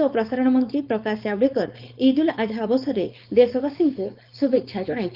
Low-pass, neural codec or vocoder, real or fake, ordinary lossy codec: 5.4 kHz; codec, 16 kHz, 4.8 kbps, FACodec; fake; Opus, 24 kbps